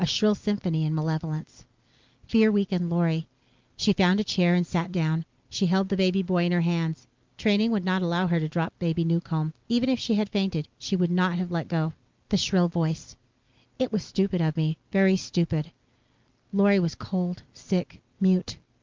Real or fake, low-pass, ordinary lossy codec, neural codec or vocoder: real; 7.2 kHz; Opus, 24 kbps; none